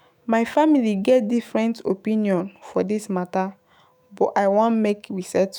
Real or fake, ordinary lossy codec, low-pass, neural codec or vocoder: fake; none; none; autoencoder, 48 kHz, 128 numbers a frame, DAC-VAE, trained on Japanese speech